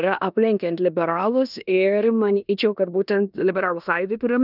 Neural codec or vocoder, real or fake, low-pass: codec, 16 kHz in and 24 kHz out, 0.9 kbps, LongCat-Audio-Codec, four codebook decoder; fake; 5.4 kHz